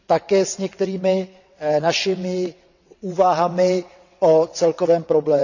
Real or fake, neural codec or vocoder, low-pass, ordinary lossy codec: fake; vocoder, 22.05 kHz, 80 mel bands, WaveNeXt; 7.2 kHz; none